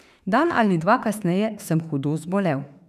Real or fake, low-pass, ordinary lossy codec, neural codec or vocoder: fake; 14.4 kHz; none; autoencoder, 48 kHz, 32 numbers a frame, DAC-VAE, trained on Japanese speech